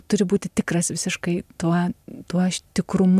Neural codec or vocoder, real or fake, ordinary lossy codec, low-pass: none; real; MP3, 96 kbps; 14.4 kHz